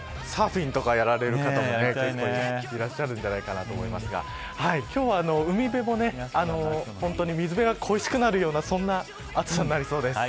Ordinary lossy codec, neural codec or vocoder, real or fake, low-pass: none; none; real; none